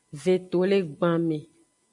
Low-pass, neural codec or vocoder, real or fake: 10.8 kHz; none; real